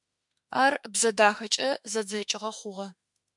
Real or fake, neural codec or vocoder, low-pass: fake; autoencoder, 48 kHz, 32 numbers a frame, DAC-VAE, trained on Japanese speech; 10.8 kHz